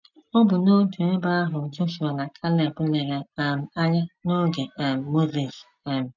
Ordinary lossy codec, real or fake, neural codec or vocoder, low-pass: none; real; none; 7.2 kHz